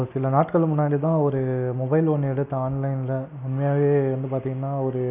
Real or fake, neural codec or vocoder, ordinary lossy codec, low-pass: real; none; none; 3.6 kHz